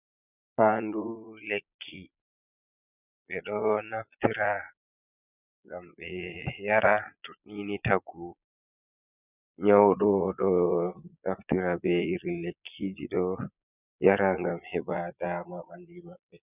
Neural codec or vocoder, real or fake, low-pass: vocoder, 22.05 kHz, 80 mel bands, Vocos; fake; 3.6 kHz